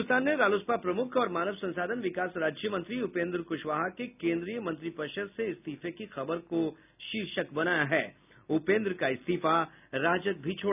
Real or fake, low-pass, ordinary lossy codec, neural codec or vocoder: real; 3.6 kHz; none; none